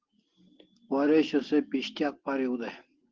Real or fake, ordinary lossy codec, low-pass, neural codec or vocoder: real; Opus, 16 kbps; 7.2 kHz; none